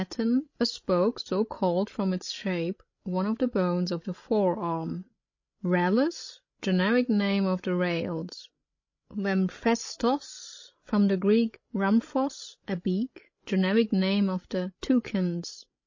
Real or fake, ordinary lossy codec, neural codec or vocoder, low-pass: fake; MP3, 32 kbps; codec, 16 kHz, 16 kbps, FreqCodec, larger model; 7.2 kHz